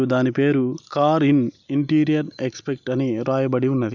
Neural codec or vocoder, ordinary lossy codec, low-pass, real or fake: none; none; 7.2 kHz; real